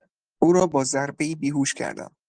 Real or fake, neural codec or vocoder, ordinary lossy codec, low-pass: real; none; Opus, 24 kbps; 9.9 kHz